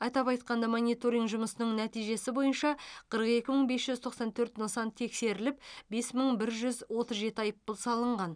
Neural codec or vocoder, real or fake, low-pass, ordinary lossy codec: none; real; 9.9 kHz; none